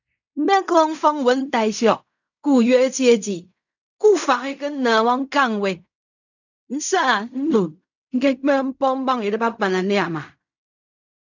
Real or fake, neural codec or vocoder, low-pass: fake; codec, 16 kHz in and 24 kHz out, 0.4 kbps, LongCat-Audio-Codec, fine tuned four codebook decoder; 7.2 kHz